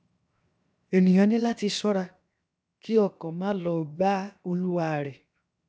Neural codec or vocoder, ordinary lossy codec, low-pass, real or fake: codec, 16 kHz, 0.7 kbps, FocalCodec; none; none; fake